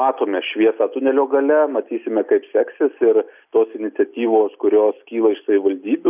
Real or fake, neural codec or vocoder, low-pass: real; none; 3.6 kHz